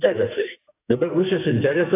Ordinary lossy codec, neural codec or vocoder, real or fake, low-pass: AAC, 24 kbps; codec, 44.1 kHz, 2.6 kbps, SNAC; fake; 3.6 kHz